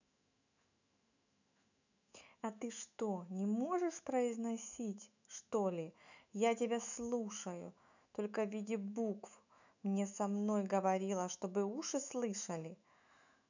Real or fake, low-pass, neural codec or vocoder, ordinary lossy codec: fake; 7.2 kHz; autoencoder, 48 kHz, 128 numbers a frame, DAC-VAE, trained on Japanese speech; none